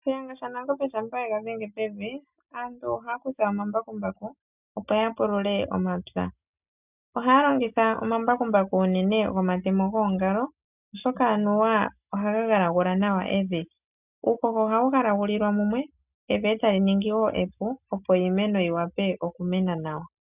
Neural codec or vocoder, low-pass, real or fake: none; 3.6 kHz; real